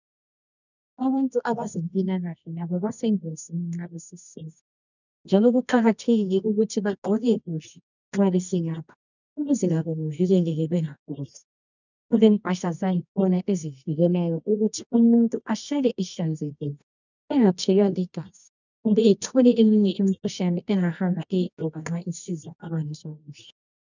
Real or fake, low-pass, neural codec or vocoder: fake; 7.2 kHz; codec, 24 kHz, 0.9 kbps, WavTokenizer, medium music audio release